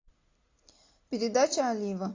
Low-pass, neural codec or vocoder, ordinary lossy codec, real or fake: 7.2 kHz; none; AAC, 32 kbps; real